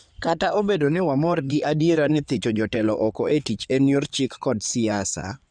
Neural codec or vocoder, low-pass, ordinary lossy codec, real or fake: codec, 16 kHz in and 24 kHz out, 2.2 kbps, FireRedTTS-2 codec; 9.9 kHz; none; fake